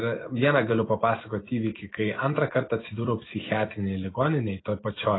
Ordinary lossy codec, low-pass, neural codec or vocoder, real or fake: AAC, 16 kbps; 7.2 kHz; none; real